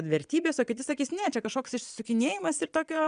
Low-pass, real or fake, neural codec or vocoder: 9.9 kHz; fake; vocoder, 22.05 kHz, 80 mel bands, Vocos